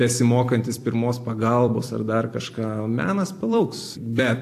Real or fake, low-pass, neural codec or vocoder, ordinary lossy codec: fake; 14.4 kHz; vocoder, 44.1 kHz, 128 mel bands every 256 samples, BigVGAN v2; AAC, 64 kbps